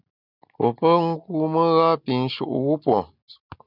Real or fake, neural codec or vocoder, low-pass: real; none; 5.4 kHz